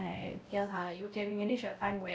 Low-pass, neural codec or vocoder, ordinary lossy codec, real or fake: none; codec, 16 kHz, 0.5 kbps, X-Codec, WavLM features, trained on Multilingual LibriSpeech; none; fake